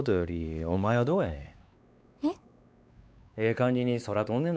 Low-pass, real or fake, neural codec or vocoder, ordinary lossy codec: none; fake; codec, 16 kHz, 4 kbps, X-Codec, WavLM features, trained on Multilingual LibriSpeech; none